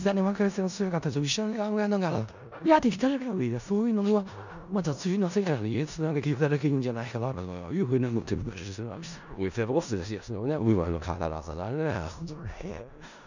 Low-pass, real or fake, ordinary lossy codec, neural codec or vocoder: 7.2 kHz; fake; none; codec, 16 kHz in and 24 kHz out, 0.4 kbps, LongCat-Audio-Codec, four codebook decoder